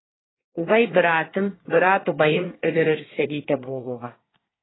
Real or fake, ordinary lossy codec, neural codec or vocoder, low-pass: fake; AAC, 16 kbps; codec, 24 kHz, 1 kbps, SNAC; 7.2 kHz